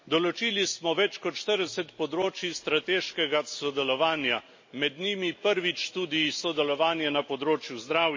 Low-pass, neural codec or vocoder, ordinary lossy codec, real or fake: 7.2 kHz; none; MP3, 32 kbps; real